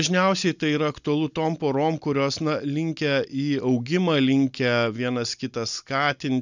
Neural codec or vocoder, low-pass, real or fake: none; 7.2 kHz; real